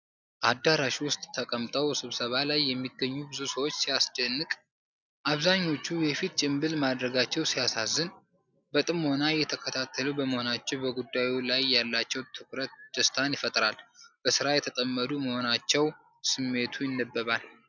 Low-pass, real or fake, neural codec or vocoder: 7.2 kHz; real; none